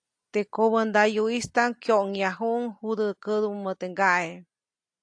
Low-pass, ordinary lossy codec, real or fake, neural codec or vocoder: 9.9 kHz; AAC, 64 kbps; real; none